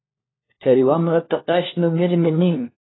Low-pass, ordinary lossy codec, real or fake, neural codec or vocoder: 7.2 kHz; AAC, 16 kbps; fake; codec, 16 kHz, 1 kbps, FunCodec, trained on LibriTTS, 50 frames a second